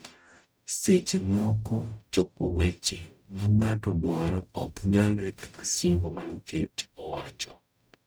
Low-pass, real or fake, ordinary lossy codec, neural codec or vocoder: none; fake; none; codec, 44.1 kHz, 0.9 kbps, DAC